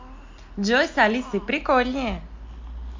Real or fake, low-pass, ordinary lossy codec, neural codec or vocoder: real; 7.2 kHz; MP3, 48 kbps; none